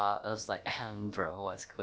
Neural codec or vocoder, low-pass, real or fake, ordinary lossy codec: codec, 16 kHz, about 1 kbps, DyCAST, with the encoder's durations; none; fake; none